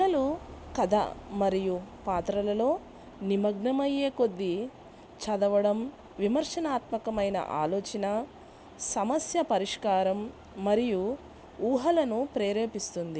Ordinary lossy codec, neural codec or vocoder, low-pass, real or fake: none; none; none; real